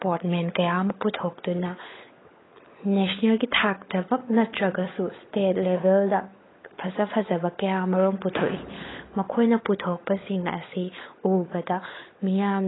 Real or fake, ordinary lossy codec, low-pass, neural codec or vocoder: fake; AAC, 16 kbps; 7.2 kHz; codec, 16 kHz, 16 kbps, FunCodec, trained on LibriTTS, 50 frames a second